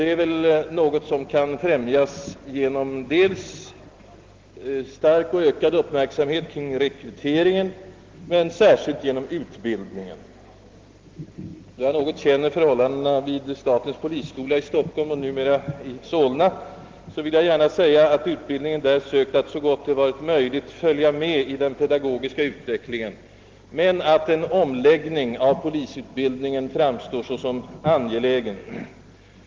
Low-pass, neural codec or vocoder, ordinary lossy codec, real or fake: 7.2 kHz; none; Opus, 16 kbps; real